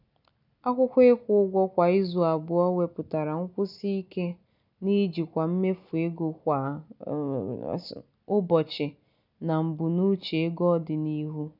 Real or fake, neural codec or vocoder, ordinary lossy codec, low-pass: real; none; none; 5.4 kHz